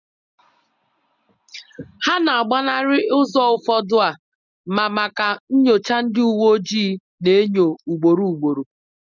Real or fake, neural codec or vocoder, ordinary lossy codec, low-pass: real; none; none; 7.2 kHz